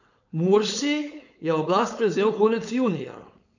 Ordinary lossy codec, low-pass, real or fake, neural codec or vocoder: none; 7.2 kHz; fake; codec, 16 kHz, 4.8 kbps, FACodec